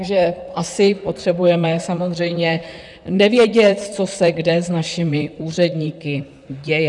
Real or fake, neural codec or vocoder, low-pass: fake; vocoder, 44.1 kHz, 128 mel bands, Pupu-Vocoder; 10.8 kHz